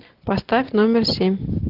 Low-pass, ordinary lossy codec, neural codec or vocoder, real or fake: 5.4 kHz; Opus, 16 kbps; none; real